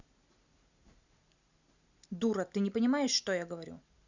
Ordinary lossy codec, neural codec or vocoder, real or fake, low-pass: Opus, 64 kbps; none; real; 7.2 kHz